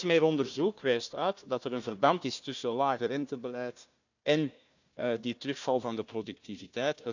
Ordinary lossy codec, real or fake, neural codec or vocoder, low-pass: none; fake; codec, 16 kHz, 1 kbps, FunCodec, trained on Chinese and English, 50 frames a second; 7.2 kHz